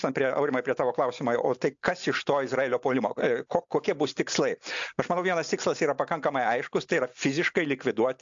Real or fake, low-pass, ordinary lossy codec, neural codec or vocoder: real; 7.2 kHz; AAC, 64 kbps; none